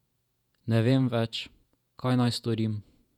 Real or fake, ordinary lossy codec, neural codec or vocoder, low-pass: fake; none; vocoder, 48 kHz, 128 mel bands, Vocos; 19.8 kHz